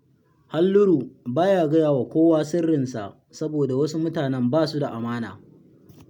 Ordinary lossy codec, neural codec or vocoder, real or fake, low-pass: none; none; real; 19.8 kHz